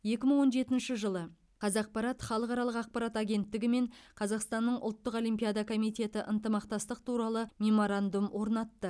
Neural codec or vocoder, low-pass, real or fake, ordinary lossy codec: none; none; real; none